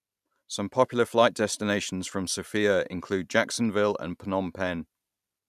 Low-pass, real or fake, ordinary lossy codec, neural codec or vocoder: 14.4 kHz; real; none; none